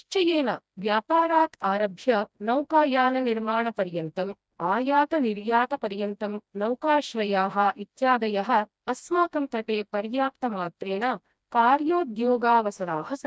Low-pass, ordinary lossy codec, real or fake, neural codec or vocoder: none; none; fake; codec, 16 kHz, 1 kbps, FreqCodec, smaller model